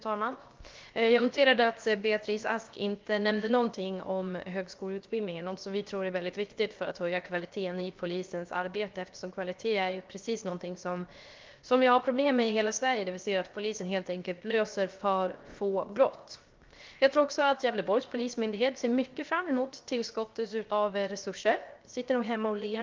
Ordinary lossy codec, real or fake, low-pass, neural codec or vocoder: Opus, 24 kbps; fake; 7.2 kHz; codec, 16 kHz, 0.7 kbps, FocalCodec